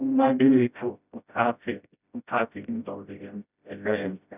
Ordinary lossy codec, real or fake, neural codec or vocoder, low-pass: none; fake; codec, 16 kHz, 0.5 kbps, FreqCodec, smaller model; 3.6 kHz